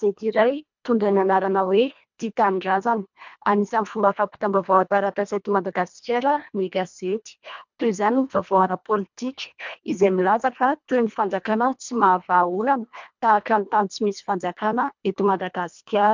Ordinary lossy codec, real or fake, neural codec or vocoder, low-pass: MP3, 64 kbps; fake; codec, 24 kHz, 1.5 kbps, HILCodec; 7.2 kHz